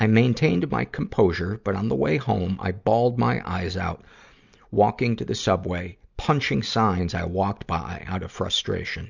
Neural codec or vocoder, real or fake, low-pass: none; real; 7.2 kHz